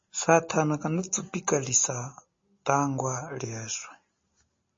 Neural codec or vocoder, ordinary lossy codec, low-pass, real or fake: none; MP3, 32 kbps; 7.2 kHz; real